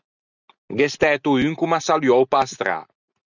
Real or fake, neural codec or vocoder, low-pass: real; none; 7.2 kHz